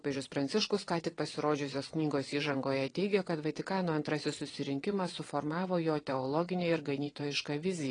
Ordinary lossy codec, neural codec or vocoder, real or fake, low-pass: AAC, 32 kbps; vocoder, 22.05 kHz, 80 mel bands, WaveNeXt; fake; 9.9 kHz